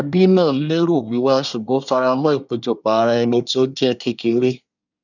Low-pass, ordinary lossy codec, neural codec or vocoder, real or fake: 7.2 kHz; none; codec, 24 kHz, 1 kbps, SNAC; fake